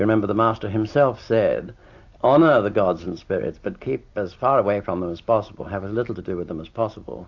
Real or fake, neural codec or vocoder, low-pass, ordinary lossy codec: real; none; 7.2 kHz; AAC, 48 kbps